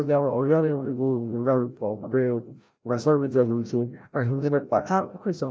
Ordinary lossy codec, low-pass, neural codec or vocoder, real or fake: none; none; codec, 16 kHz, 0.5 kbps, FreqCodec, larger model; fake